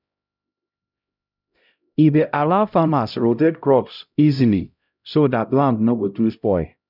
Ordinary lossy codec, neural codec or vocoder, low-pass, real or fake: none; codec, 16 kHz, 0.5 kbps, X-Codec, HuBERT features, trained on LibriSpeech; 5.4 kHz; fake